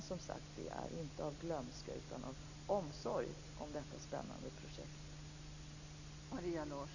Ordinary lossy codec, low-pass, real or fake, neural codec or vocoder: none; 7.2 kHz; real; none